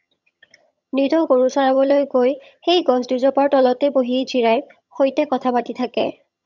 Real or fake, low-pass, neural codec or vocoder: fake; 7.2 kHz; vocoder, 22.05 kHz, 80 mel bands, HiFi-GAN